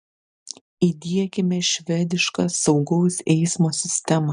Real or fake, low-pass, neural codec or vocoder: real; 9.9 kHz; none